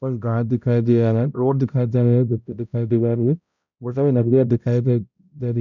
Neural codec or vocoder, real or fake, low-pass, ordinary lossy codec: codec, 16 kHz, 0.5 kbps, X-Codec, HuBERT features, trained on balanced general audio; fake; 7.2 kHz; none